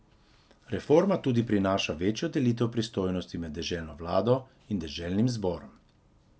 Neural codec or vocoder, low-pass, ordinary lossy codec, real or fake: none; none; none; real